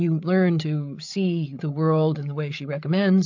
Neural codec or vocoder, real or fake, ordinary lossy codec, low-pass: codec, 16 kHz, 8 kbps, FreqCodec, larger model; fake; MP3, 64 kbps; 7.2 kHz